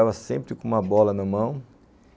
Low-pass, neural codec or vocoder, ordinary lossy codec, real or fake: none; none; none; real